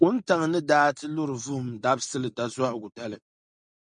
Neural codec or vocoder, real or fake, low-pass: none; real; 9.9 kHz